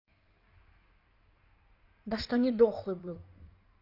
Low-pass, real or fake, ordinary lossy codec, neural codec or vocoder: 5.4 kHz; fake; none; codec, 16 kHz in and 24 kHz out, 2.2 kbps, FireRedTTS-2 codec